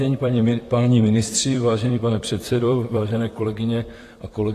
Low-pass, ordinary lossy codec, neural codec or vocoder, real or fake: 14.4 kHz; AAC, 48 kbps; vocoder, 44.1 kHz, 128 mel bands, Pupu-Vocoder; fake